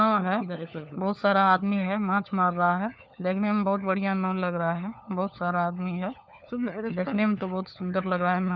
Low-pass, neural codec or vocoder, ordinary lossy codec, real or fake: none; codec, 16 kHz, 4 kbps, FunCodec, trained on LibriTTS, 50 frames a second; none; fake